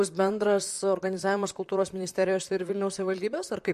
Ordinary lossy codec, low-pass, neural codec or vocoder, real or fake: MP3, 64 kbps; 14.4 kHz; vocoder, 44.1 kHz, 128 mel bands, Pupu-Vocoder; fake